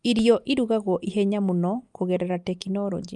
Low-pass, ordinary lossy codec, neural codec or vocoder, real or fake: 10.8 kHz; Opus, 32 kbps; none; real